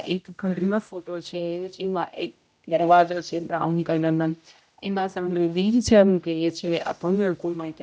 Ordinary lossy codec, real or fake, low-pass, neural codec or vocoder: none; fake; none; codec, 16 kHz, 0.5 kbps, X-Codec, HuBERT features, trained on general audio